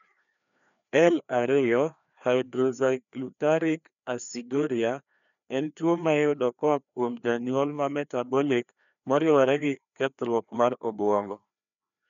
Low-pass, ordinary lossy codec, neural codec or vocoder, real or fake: 7.2 kHz; none; codec, 16 kHz, 2 kbps, FreqCodec, larger model; fake